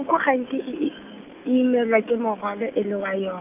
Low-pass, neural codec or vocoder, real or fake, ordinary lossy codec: 3.6 kHz; none; real; none